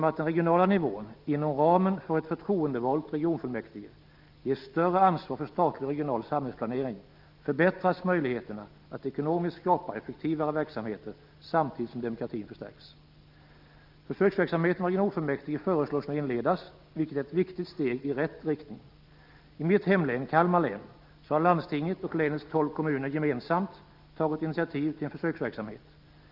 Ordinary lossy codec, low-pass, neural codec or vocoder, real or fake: Opus, 24 kbps; 5.4 kHz; none; real